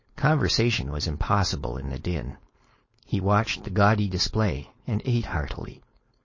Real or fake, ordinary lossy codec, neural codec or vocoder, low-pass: fake; MP3, 32 kbps; codec, 16 kHz, 4.8 kbps, FACodec; 7.2 kHz